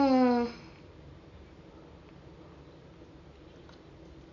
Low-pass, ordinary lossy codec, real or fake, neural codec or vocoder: 7.2 kHz; AAC, 32 kbps; real; none